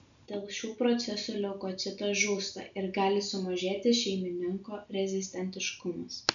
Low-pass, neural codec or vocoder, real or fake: 7.2 kHz; none; real